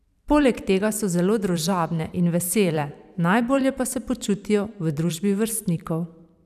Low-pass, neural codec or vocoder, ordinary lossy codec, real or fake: 14.4 kHz; vocoder, 44.1 kHz, 128 mel bands every 512 samples, BigVGAN v2; none; fake